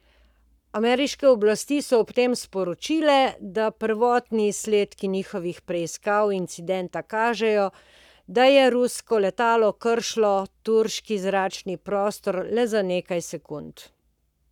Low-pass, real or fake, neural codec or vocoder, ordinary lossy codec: 19.8 kHz; fake; codec, 44.1 kHz, 7.8 kbps, Pupu-Codec; none